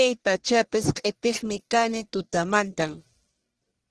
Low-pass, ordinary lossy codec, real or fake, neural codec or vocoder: 10.8 kHz; Opus, 16 kbps; fake; codec, 44.1 kHz, 1.7 kbps, Pupu-Codec